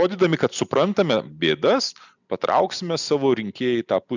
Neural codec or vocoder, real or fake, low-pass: none; real; 7.2 kHz